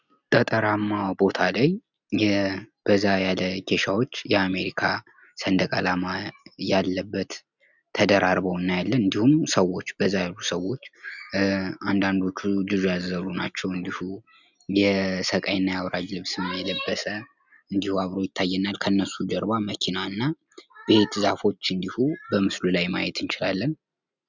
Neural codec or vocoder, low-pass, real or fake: none; 7.2 kHz; real